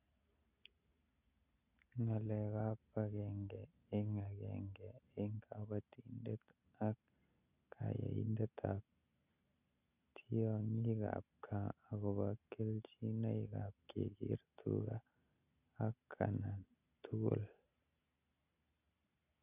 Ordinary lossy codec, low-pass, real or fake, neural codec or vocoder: none; 3.6 kHz; real; none